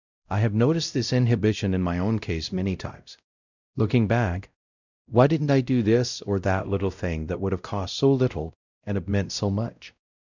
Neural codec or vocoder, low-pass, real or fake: codec, 16 kHz, 0.5 kbps, X-Codec, WavLM features, trained on Multilingual LibriSpeech; 7.2 kHz; fake